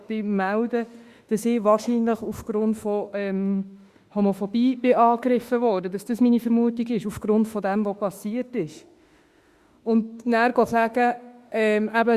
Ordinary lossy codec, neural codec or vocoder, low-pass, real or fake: Opus, 64 kbps; autoencoder, 48 kHz, 32 numbers a frame, DAC-VAE, trained on Japanese speech; 14.4 kHz; fake